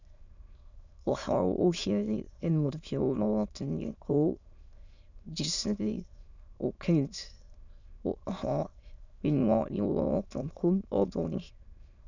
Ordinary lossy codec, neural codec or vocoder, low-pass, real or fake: none; autoencoder, 22.05 kHz, a latent of 192 numbers a frame, VITS, trained on many speakers; 7.2 kHz; fake